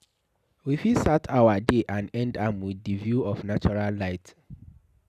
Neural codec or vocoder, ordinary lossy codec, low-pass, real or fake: none; none; 14.4 kHz; real